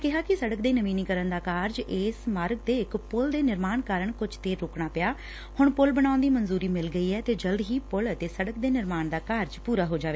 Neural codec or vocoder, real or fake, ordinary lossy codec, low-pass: none; real; none; none